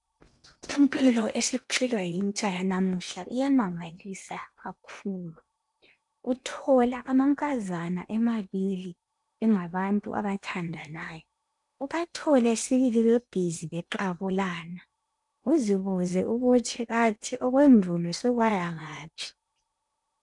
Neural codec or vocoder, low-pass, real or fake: codec, 16 kHz in and 24 kHz out, 0.8 kbps, FocalCodec, streaming, 65536 codes; 10.8 kHz; fake